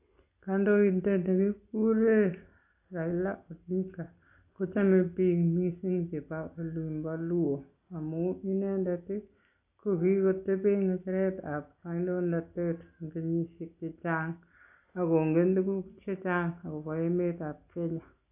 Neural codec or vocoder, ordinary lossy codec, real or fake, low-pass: none; AAC, 32 kbps; real; 3.6 kHz